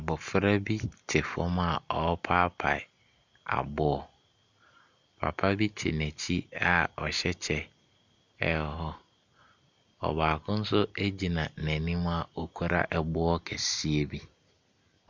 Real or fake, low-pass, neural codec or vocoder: real; 7.2 kHz; none